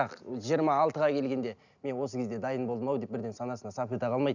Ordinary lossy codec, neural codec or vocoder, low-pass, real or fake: none; none; 7.2 kHz; real